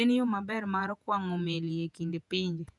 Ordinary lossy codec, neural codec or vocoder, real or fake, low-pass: none; vocoder, 48 kHz, 128 mel bands, Vocos; fake; 10.8 kHz